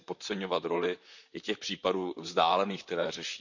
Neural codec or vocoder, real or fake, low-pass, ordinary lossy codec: vocoder, 44.1 kHz, 128 mel bands, Pupu-Vocoder; fake; 7.2 kHz; none